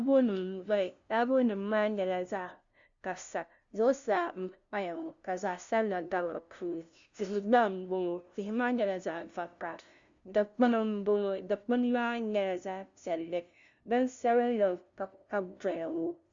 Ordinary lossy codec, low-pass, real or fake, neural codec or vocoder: Opus, 64 kbps; 7.2 kHz; fake; codec, 16 kHz, 0.5 kbps, FunCodec, trained on LibriTTS, 25 frames a second